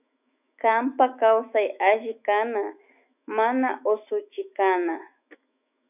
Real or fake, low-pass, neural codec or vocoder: fake; 3.6 kHz; autoencoder, 48 kHz, 128 numbers a frame, DAC-VAE, trained on Japanese speech